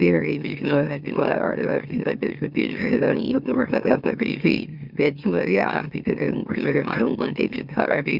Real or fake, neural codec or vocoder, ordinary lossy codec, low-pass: fake; autoencoder, 44.1 kHz, a latent of 192 numbers a frame, MeloTTS; Opus, 64 kbps; 5.4 kHz